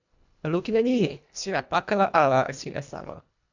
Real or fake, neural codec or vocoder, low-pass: fake; codec, 24 kHz, 1.5 kbps, HILCodec; 7.2 kHz